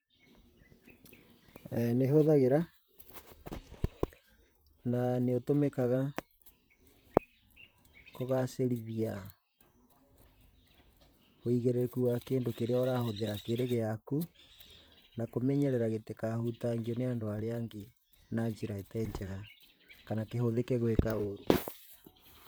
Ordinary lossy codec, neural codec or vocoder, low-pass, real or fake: none; vocoder, 44.1 kHz, 128 mel bands, Pupu-Vocoder; none; fake